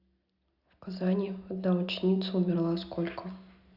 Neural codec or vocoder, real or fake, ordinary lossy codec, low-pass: none; real; Opus, 64 kbps; 5.4 kHz